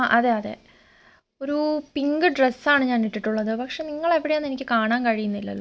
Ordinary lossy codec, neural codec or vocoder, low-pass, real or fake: none; none; none; real